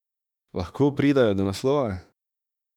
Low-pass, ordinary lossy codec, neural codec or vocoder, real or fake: 19.8 kHz; none; autoencoder, 48 kHz, 32 numbers a frame, DAC-VAE, trained on Japanese speech; fake